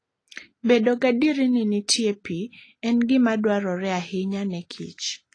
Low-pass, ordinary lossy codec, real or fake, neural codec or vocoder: 9.9 kHz; AAC, 32 kbps; real; none